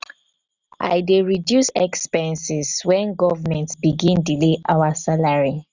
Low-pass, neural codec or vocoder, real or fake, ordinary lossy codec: 7.2 kHz; none; real; none